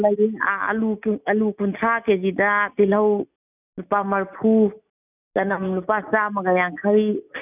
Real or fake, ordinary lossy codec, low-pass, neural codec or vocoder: real; none; 3.6 kHz; none